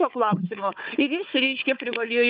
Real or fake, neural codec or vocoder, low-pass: fake; codec, 16 kHz, 4 kbps, FunCodec, trained on Chinese and English, 50 frames a second; 5.4 kHz